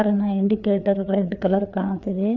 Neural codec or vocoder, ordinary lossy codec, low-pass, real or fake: codec, 16 kHz, 4 kbps, FreqCodec, larger model; none; 7.2 kHz; fake